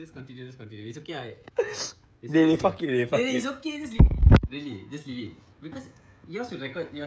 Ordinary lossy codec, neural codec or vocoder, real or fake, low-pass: none; codec, 16 kHz, 16 kbps, FreqCodec, smaller model; fake; none